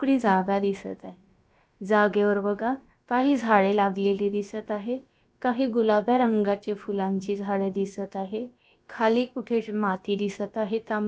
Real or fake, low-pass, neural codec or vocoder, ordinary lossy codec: fake; none; codec, 16 kHz, about 1 kbps, DyCAST, with the encoder's durations; none